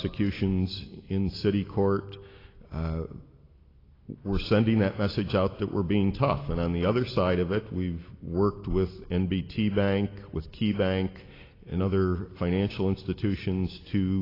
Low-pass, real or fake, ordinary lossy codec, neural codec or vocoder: 5.4 kHz; real; AAC, 24 kbps; none